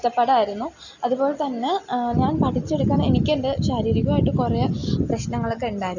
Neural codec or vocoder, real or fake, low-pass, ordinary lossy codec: none; real; 7.2 kHz; none